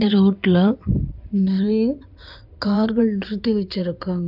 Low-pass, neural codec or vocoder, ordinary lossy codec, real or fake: 5.4 kHz; codec, 16 kHz in and 24 kHz out, 2.2 kbps, FireRedTTS-2 codec; none; fake